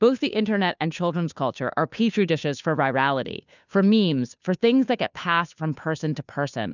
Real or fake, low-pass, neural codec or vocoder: fake; 7.2 kHz; codec, 16 kHz, 2 kbps, FunCodec, trained on Chinese and English, 25 frames a second